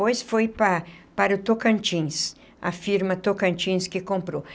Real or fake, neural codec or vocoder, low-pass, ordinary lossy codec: real; none; none; none